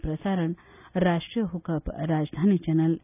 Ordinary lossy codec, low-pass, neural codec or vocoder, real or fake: none; 3.6 kHz; none; real